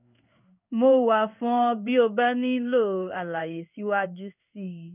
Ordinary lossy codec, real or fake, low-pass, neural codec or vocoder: none; fake; 3.6 kHz; codec, 16 kHz in and 24 kHz out, 1 kbps, XY-Tokenizer